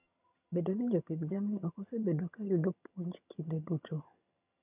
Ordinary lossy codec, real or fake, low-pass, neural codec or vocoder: none; fake; 3.6 kHz; vocoder, 22.05 kHz, 80 mel bands, HiFi-GAN